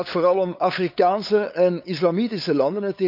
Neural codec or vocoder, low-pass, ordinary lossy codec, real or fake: codec, 16 kHz, 8 kbps, FunCodec, trained on LibriTTS, 25 frames a second; 5.4 kHz; none; fake